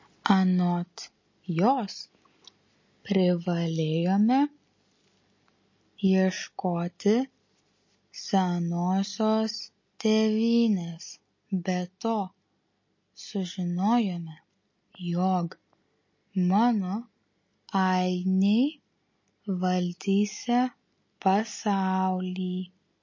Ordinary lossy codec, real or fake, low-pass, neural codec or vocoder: MP3, 32 kbps; real; 7.2 kHz; none